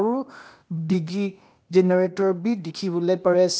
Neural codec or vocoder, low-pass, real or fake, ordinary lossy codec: codec, 16 kHz, 0.8 kbps, ZipCodec; none; fake; none